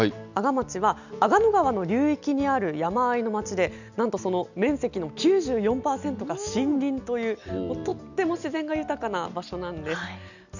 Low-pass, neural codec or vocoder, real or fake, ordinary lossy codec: 7.2 kHz; none; real; none